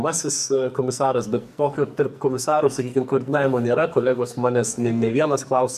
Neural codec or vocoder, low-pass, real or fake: codec, 44.1 kHz, 2.6 kbps, SNAC; 14.4 kHz; fake